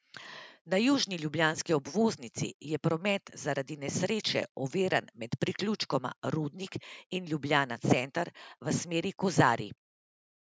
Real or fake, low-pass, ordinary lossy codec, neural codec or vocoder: real; none; none; none